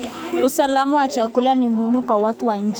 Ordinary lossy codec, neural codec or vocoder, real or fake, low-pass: none; codec, 44.1 kHz, 2.6 kbps, SNAC; fake; none